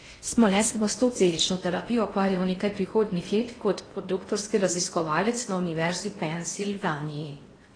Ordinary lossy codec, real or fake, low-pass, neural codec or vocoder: AAC, 32 kbps; fake; 9.9 kHz; codec, 16 kHz in and 24 kHz out, 0.6 kbps, FocalCodec, streaming, 4096 codes